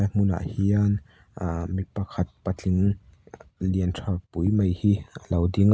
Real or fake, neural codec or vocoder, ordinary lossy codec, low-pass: real; none; none; none